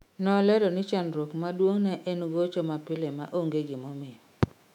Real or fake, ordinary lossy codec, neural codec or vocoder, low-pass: fake; none; autoencoder, 48 kHz, 128 numbers a frame, DAC-VAE, trained on Japanese speech; 19.8 kHz